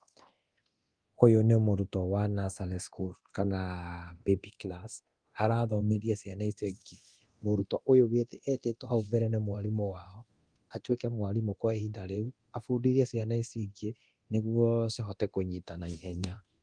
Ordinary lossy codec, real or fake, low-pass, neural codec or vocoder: Opus, 24 kbps; fake; 9.9 kHz; codec, 24 kHz, 0.9 kbps, DualCodec